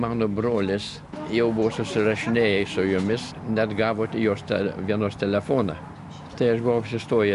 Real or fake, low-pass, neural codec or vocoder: real; 10.8 kHz; none